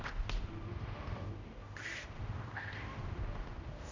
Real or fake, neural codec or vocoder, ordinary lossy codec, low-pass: fake; codec, 16 kHz, 0.5 kbps, X-Codec, HuBERT features, trained on general audio; MP3, 48 kbps; 7.2 kHz